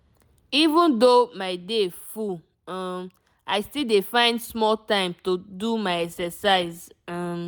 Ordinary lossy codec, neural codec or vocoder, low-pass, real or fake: none; none; none; real